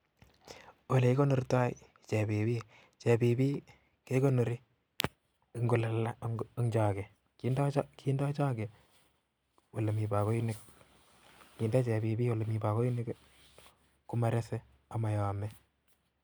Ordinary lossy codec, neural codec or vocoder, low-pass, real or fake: none; none; none; real